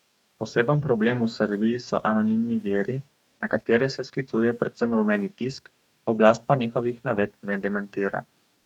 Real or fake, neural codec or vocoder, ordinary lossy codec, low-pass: fake; codec, 44.1 kHz, 2.6 kbps, DAC; none; 19.8 kHz